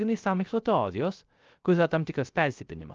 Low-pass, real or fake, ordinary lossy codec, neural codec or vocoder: 7.2 kHz; fake; Opus, 24 kbps; codec, 16 kHz, 0.3 kbps, FocalCodec